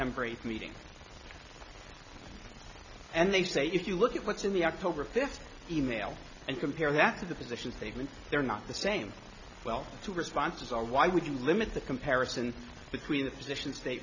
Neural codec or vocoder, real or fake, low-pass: none; real; 7.2 kHz